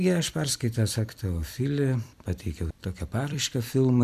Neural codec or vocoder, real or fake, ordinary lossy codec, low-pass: vocoder, 44.1 kHz, 128 mel bands every 256 samples, BigVGAN v2; fake; MP3, 96 kbps; 14.4 kHz